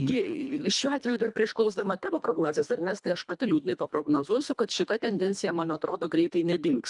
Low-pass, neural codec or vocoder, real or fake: 10.8 kHz; codec, 24 kHz, 1.5 kbps, HILCodec; fake